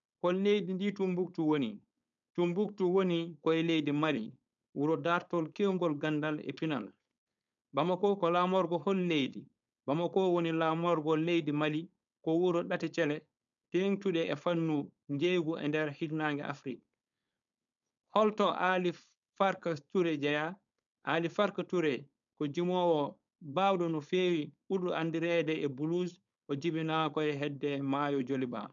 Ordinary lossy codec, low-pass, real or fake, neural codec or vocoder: none; 7.2 kHz; fake; codec, 16 kHz, 4.8 kbps, FACodec